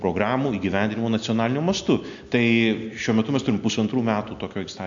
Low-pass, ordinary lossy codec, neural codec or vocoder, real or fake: 7.2 kHz; AAC, 64 kbps; none; real